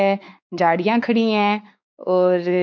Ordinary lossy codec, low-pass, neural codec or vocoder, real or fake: none; none; codec, 16 kHz, 4 kbps, X-Codec, WavLM features, trained on Multilingual LibriSpeech; fake